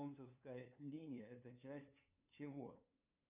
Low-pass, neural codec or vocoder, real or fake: 3.6 kHz; codec, 16 kHz, 2 kbps, FunCodec, trained on LibriTTS, 25 frames a second; fake